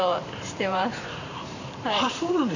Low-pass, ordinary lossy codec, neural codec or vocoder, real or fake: 7.2 kHz; none; vocoder, 44.1 kHz, 128 mel bands every 512 samples, BigVGAN v2; fake